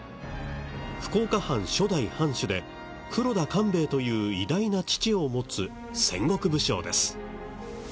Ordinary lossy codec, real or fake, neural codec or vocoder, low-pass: none; real; none; none